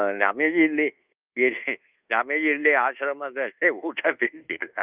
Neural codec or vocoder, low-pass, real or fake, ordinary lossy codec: codec, 24 kHz, 1.2 kbps, DualCodec; 3.6 kHz; fake; Opus, 24 kbps